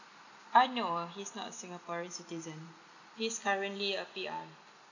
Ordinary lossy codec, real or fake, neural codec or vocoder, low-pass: none; real; none; 7.2 kHz